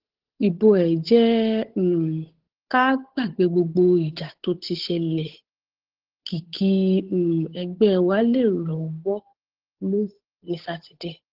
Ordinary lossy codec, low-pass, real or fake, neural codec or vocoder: Opus, 16 kbps; 5.4 kHz; fake; codec, 16 kHz, 8 kbps, FunCodec, trained on Chinese and English, 25 frames a second